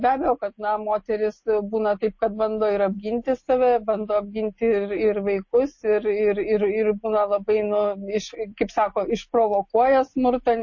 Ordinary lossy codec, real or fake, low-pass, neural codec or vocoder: MP3, 32 kbps; real; 7.2 kHz; none